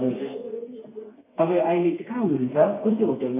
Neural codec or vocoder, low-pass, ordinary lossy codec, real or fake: codec, 32 kHz, 1.9 kbps, SNAC; 3.6 kHz; none; fake